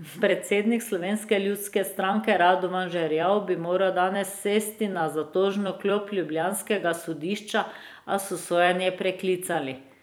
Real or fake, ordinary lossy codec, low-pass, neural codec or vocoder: fake; none; none; vocoder, 44.1 kHz, 128 mel bands every 256 samples, BigVGAN v2